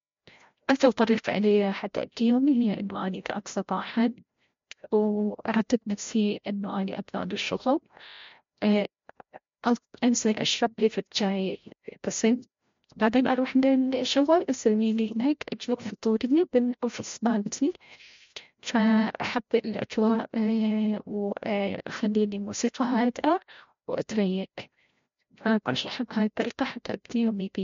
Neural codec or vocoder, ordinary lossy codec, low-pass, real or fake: codec, 16 kHz, 0.5 kbps, FreqCodec, larger model; MP3, 48 kbps; 7.2 kHz; fake